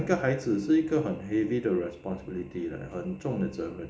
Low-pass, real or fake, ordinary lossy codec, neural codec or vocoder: none; real; none; none